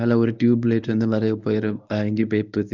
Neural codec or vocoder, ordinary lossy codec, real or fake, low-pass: codec, 24 kHz, 0.9 kbps, WavTokenizer, medium speech release version 1; none; fake; 7.2 kHz